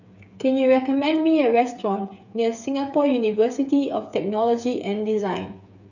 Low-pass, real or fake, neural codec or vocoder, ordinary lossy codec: 7.2 kHz; fake; codec, 16 kHz, 8 kbps, FreqCodec, smaller model; none